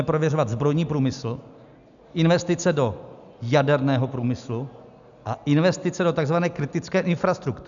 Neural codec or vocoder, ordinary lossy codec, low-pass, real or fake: none; MP3, 96 kbps; 7.2 kHz; real